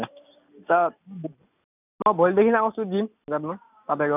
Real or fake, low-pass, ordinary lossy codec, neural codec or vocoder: real; 3.6 kHz; none; none